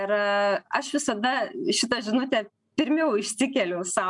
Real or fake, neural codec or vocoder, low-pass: real; none; 10.8 kHz